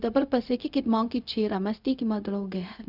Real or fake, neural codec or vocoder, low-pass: fake; codec, 16 kHz, 0.4 kbps, LongCat-Audio-Codec; 5.4 kHz